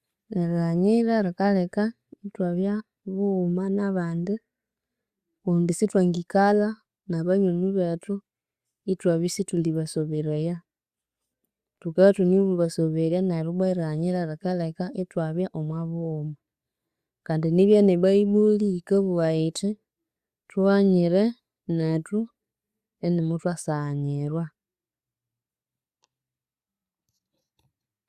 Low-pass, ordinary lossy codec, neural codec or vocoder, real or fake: 14.4 kHz; Opus, 64 kbps; none; real